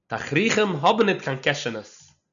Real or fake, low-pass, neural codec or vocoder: real; 7.2 kHz; none